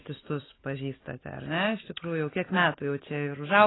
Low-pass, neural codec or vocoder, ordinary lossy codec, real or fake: 7.2 kHz; none; AAC, 16 kbps; real